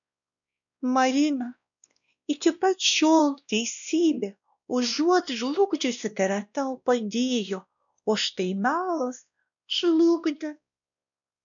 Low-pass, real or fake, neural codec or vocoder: 7.2 kHz; fake; codec, 16 kHz, 1 kbps, X-Codec, WavLM features, trained on Multilingual LibriSpeech